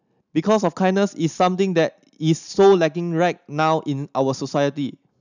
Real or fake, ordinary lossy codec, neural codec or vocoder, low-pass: real; none; none; 7.2 kHz